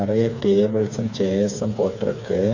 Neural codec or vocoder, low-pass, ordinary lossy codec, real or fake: codec, 16 kHz, 4 kbps, FreqCodec, smaller model; 7.2 kHz; AAC, 48 kbps; fake